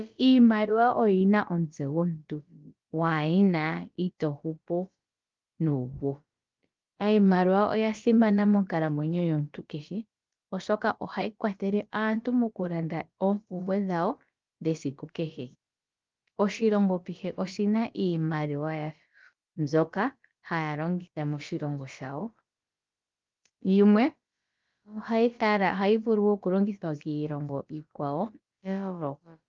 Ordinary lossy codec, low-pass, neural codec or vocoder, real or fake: Opus, 32 kbps; 7.2 kHz; codec, 16 kHz, about 1 kbps, DyCAST, with the encoder's durations; fake